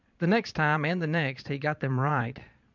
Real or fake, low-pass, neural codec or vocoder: fake; 7.2 kHz; vocoder, 22.05 kHz, 80 mel bands, Vocos